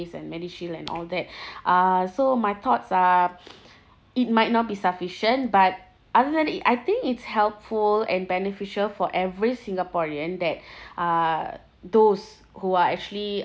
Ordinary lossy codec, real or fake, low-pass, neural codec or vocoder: none; real; none; none